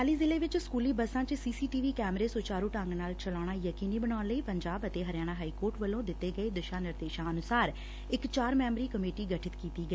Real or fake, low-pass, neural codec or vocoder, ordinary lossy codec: real; none; none; none